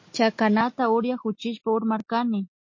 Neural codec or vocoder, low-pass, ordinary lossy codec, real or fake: none; 7.2 kHz; MP3, 32 kbps; real